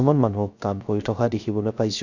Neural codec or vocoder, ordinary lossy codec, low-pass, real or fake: codec, 16 kHz, 0.3 kbps, FocalCodec; none; 7.2 kHz; fake